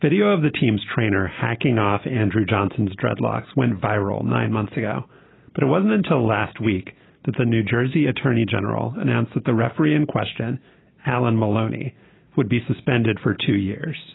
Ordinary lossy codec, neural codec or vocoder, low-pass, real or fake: AAC, 16 kbps; none; 7.2 kHz; real